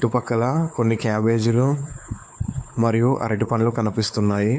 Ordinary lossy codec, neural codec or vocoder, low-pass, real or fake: none; codec, 16 kHz, 4 kbps, X-Codec, WavLM features, trained on Multilingual LibriSpeech; none; fake